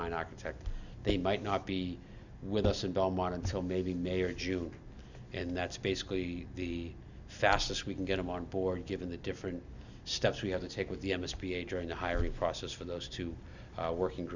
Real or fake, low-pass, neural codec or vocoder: real; 7.2 kHz; none